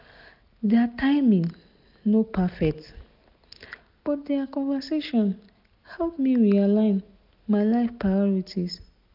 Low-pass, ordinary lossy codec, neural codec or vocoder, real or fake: 5.4 kHz; none; none; real